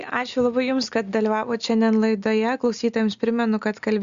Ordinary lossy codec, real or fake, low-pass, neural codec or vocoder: Opus, 64 kbps; real; 7.2 kHz; none